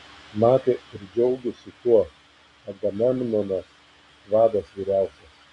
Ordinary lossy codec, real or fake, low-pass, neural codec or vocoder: AAC, 64 kbps; real; 10.8 kHz; none